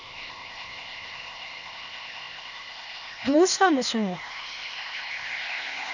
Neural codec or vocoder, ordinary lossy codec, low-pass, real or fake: codec, 16 kHz, 0.8 kbps, ZipCodec; none; 7.2 kHz; fake